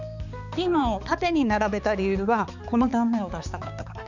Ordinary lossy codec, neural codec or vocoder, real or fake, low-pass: none; codec, 16 kHz, 4 kbps, X-Codec, HuBERT features, trained on balanced general audio; fake; 7.2 kHz